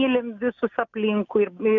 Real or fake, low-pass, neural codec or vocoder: real; 7.2 kHz; none